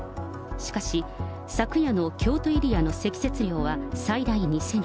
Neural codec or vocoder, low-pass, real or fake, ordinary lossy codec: none; none; real; none